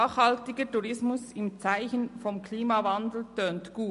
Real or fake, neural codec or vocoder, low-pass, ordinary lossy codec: real; none; 14.4 kHz; MP3, 48 kbps